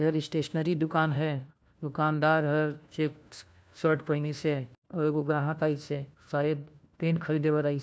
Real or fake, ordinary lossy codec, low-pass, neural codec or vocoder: fake; none; none; codec, 16 kHz, 1 kbps, FunCodec, trained on LibriTTS, 50 frames a second